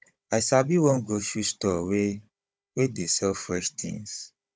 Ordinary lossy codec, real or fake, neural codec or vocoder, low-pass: none; fake; codec, 16 kHz, 16 kbps, FunCodec, trained on Chinese and English, 50 frames a second; none